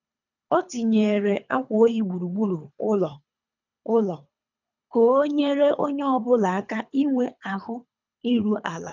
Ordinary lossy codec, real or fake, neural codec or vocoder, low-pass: none; fake; codec, 24 kHz, 3 kbps, HILCodec; 7.2 kHz